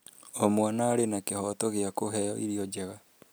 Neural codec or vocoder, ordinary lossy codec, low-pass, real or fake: none; none; none; real